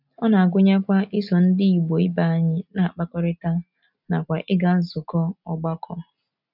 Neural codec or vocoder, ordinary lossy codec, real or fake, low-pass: none; none; real; 5.4 kHz